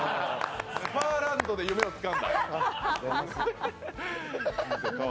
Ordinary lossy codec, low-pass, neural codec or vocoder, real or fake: none; none; none; real